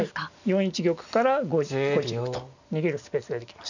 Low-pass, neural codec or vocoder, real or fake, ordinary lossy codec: 7.2 kHz; none; real; none